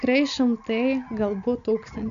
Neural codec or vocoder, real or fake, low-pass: none; real; 7.2 kHz